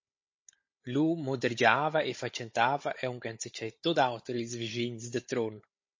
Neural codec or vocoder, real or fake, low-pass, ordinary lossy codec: codec, 16 kHz, 16 kbps, FunCodec, trained on Chinese and English, 50 frames a second; fake; 7.2 kHz; MP3, 32 kbps